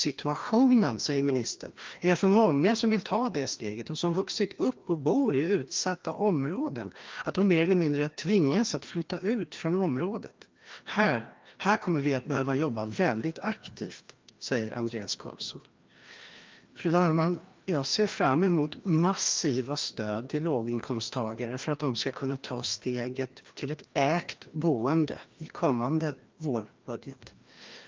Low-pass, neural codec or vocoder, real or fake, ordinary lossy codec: 7.2 kHz; codec, 16 kHz, 1 kbps, FreqCodec, larger model; fake; Opus, 32 kbps